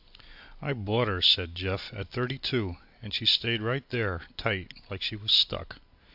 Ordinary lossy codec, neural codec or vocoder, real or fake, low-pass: AAC, 48 kbps; none; real; 5.4 kHz